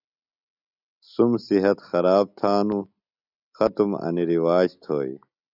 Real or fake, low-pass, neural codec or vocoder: real; 5.4 kHz; none